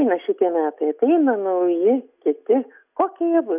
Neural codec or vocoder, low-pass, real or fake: none; 3.6 kHz; real